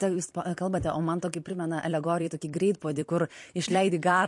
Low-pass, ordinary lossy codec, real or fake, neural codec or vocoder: 10.8 kHz; MP3, 48 kbps; fake; vocoder, 44.1 kHz, 128 mel bands every 256 samples, BigVGAN v2